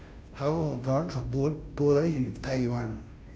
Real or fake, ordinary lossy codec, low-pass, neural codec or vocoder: fake; none; none; codec, 16 kHz, 0.5 kbps, FunCodec, trained on Chinese and English, 25 frames a second